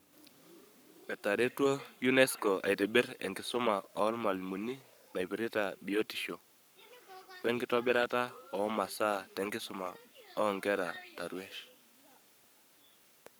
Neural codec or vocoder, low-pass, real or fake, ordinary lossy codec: codec, 44.1 kHz, 7.8 kbps, Pupu-Codec; none; fake; none